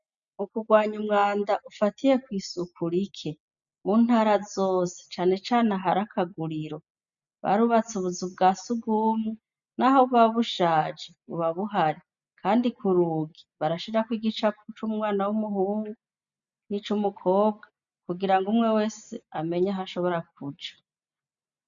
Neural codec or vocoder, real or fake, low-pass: none; real; 7.2 kHz